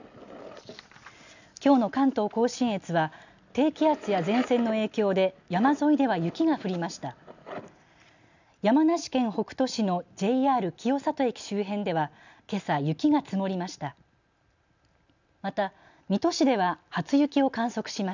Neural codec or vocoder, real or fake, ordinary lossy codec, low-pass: none; real; none; 7.2 kHz